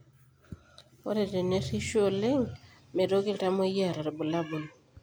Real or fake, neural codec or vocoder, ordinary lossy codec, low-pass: real; none; none; none